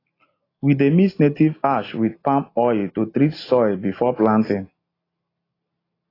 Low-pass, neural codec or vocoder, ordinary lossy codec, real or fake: 5.4 kHz; none; AAC, 24 kbps; real